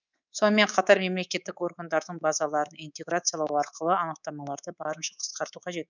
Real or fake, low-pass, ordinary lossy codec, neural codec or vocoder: fake; 7.2 kHz; none; codec, 24 kHz, 3.1 kbps, DualCodec